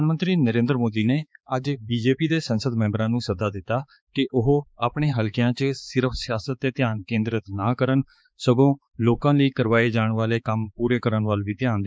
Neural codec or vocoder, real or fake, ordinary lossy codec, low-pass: codec, 16 kHz, 4 kbps, X-Codec, HuBERT features, trained on balanced general audio; fake; none; none